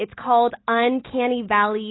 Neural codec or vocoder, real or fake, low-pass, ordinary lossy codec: none; real; 7.2 kHz; AAC, 16 kbps